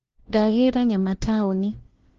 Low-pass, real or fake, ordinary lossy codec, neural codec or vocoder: 7.2 kHz; fake; Opus, 16 kbps; codec, 16 kHz, 1 kbps, FunCodec, trained on LibriTTS, 50 frames a second